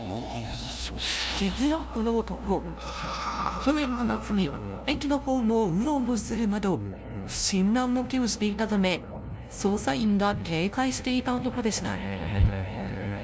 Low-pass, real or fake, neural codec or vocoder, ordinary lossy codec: none; fake; codec, 16 kHz, 0.5 kbps, FunCodec, trained on LibriTTS, 25 frames a second; none